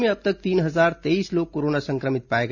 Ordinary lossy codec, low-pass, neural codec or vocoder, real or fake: none; 7.2 kHz; none; real